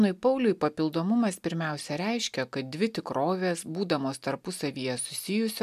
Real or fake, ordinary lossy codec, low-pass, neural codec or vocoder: real; MP3, 96 kbps; 14.4 kHz; none